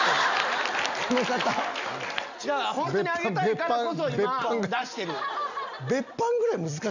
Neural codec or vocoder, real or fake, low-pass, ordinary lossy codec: none; real; 7.2 kHz; none